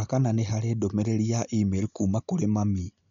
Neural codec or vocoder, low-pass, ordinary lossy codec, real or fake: none; 7.2 kHz; MP3, 64 kbps; real